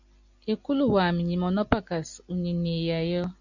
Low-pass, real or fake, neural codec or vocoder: 7.2 kHz; real; none